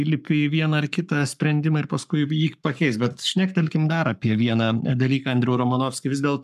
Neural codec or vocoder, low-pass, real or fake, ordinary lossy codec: codec, 44.1 kHz, 7.8 kbps, Pupu-Codec; 14.4 kHz; fake; MP3, 96 kbps